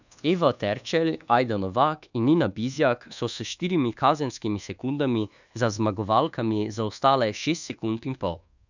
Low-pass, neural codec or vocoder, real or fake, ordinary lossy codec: 7.2 kHz; codec, 24 kHz, 1.2 kbps, DualCodec; fake; none